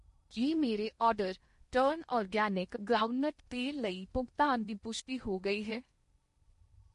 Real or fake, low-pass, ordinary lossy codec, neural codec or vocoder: fake; 10.8 kHz; MP3, 48 kbps; codec, 16 kHz in and 24 kHz out, 0.8 kbps, FocalCodec, streaming, 65536 codes